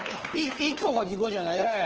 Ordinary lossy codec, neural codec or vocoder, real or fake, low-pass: Opus, 16 kbps; codec, 16 kHz, 4 kbps, FunCodec, trained on LibriTTS, 50 frames a second; fake; 7.2 kHz